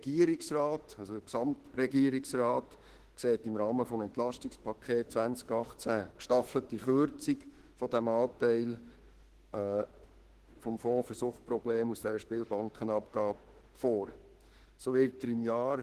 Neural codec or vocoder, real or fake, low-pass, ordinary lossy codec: autoencoder, 48 kHz, 32 numbers a frame, DAC-VAE, trained on Japanese speech; fake; 14.4 kHz; Opus, 16 kbps